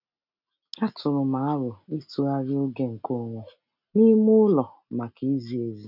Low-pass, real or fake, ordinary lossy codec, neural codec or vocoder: 5.4 kHz; real; none; none